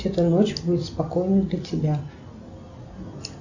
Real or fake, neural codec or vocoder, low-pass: real; none; 7.2 kHz